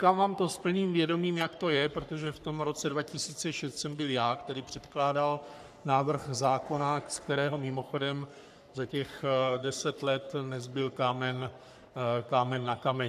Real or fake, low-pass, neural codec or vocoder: fake; 14.4 kHz; codec, 44.1 kHz, 3.4 kbps, Pupu-Codec